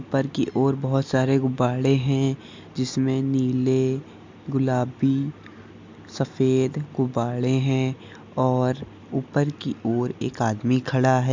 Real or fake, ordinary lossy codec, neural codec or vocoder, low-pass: real; MP3, 64 kbps; none; 7.2 kHz